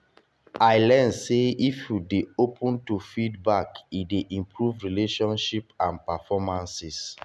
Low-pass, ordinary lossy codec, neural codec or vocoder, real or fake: none; none; none; real